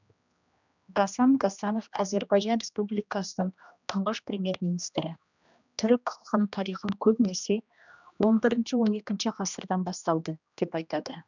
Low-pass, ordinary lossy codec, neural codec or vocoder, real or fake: 7.2 kHz; none; codec, 16 kHz, 1 kbps, X-Codec, HuBERT features, trained on general audio; fake